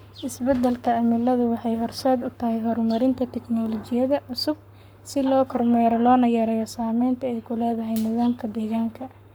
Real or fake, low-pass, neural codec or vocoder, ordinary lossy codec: fake; none; codec, 44.1 kHz, 7.8 kbps, Pupu-Codec; none